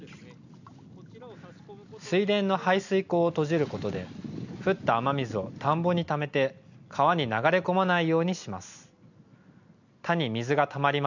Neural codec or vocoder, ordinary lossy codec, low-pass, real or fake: none; none; 7.2 kHz; real